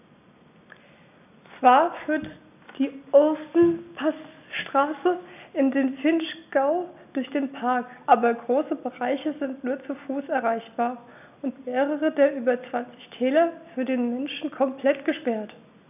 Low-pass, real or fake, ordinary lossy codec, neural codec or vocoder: 3.6 kHz; real; none; none